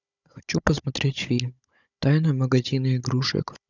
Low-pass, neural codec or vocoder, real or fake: 7.2 kHz; codec, 16 kHz, 16 kbps, FunCodec, trained on Chinese and English, 50 frames a second; fake